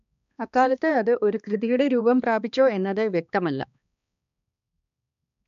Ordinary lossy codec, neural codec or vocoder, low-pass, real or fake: none; codec, 16 kHz, 2 kbps, X-Codec, HuBERT features, trained on balanced general audio; 7.2 kHz; fake